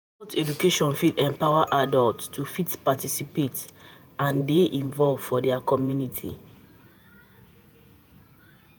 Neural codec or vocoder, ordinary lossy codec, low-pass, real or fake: vocoder, 48 kHz, 128 mel bands, Vocos; none; none; fake